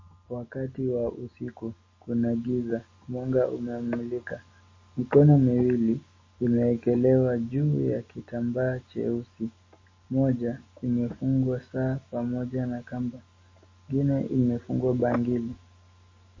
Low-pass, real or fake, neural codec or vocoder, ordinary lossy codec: 7.2 kHz; real; none; MP3, 32 kbps